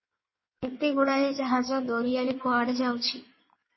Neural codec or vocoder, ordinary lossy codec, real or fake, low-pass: codec, 16 kHz in and 24 kHz out, 1.1 kbps, FireRedTTS-2 codec; MP3, 24 kbps; fake; 7.2 kHz